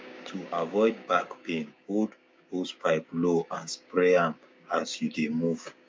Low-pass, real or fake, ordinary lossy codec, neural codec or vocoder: none; fake; none; codec, 16 kHz, 6 kbps, DAC